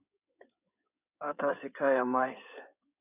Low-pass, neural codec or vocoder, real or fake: 3.6 kHz; codec, 16 kHz in and 24 kHz out, 2.2 kbps, FireRedTTS-2 codec; fake